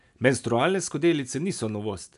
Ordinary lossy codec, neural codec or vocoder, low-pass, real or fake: none; none; 10.8 kHz; real